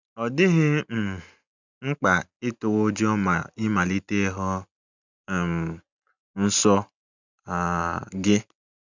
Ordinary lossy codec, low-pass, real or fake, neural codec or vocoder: none; 7.2 kHz; real; none